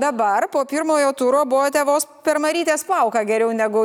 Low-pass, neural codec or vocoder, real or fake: 19.8 kHz; none; real